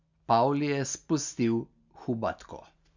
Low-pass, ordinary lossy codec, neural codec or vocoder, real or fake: 7.2 kHz; Opus, 64 kbps; none; real